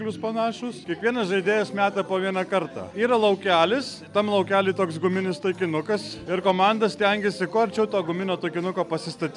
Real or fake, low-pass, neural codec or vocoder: real; 10.8 kHz; none